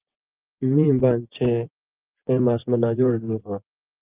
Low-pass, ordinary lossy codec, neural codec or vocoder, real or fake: 3.6 kHz; Opus, 32 kbps; vocoder, 24 kHz, 100 mel bands, Vocos; fake